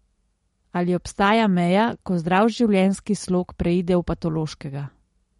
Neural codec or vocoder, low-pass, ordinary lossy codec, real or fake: none; 19.8 kHz; MP3, 48 kbps; real